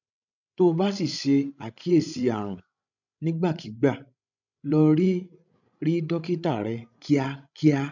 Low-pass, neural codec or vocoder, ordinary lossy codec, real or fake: 7.2 kHz; codec, 16 kHz, 16 kbps, FreqCodec, larger model; none; fake